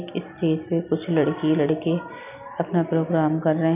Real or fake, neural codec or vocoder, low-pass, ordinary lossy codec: real; none; 3.6 kHz; none